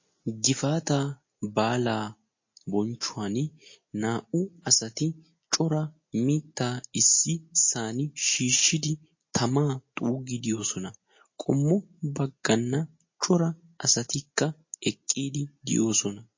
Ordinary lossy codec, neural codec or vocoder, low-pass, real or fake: MP3, 32 kbps; none; 7.2 kHz; real